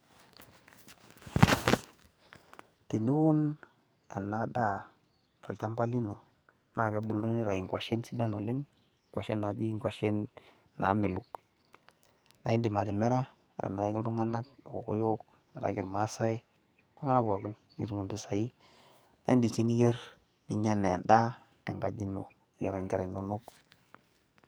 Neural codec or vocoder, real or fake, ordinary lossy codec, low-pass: codec, 44.1 kHz, 2.6 kbps, SNAC; fake; none; none